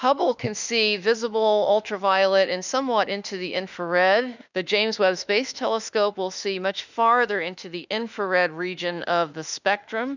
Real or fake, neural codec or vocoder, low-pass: fake; autoencoder, 48 kHz, 32 numbers a frame, DAC-VAE, trained on Japanese speech; 7.2 kHz